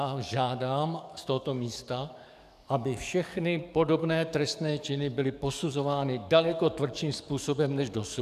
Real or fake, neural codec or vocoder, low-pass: fake; codec, 44.1 kHz, 7.8 kbps, DAC; 14.4 kHz